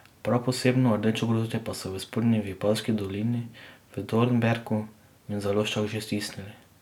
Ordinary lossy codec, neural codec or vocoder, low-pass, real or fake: none; none; 19.8 kHz; real